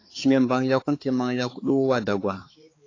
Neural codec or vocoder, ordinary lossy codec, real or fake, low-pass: codec, 16 kHz, 4 kbps, X-Codec, HuBERT features, trained on balanced general audio; AAC, 32 kbps; fake; 7.2 kHz